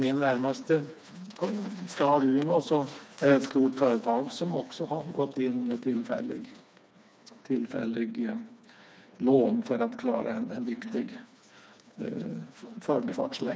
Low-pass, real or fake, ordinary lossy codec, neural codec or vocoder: none; fake; none; codec, 16 kHz, 2 kbps, FreqCodec, smaller model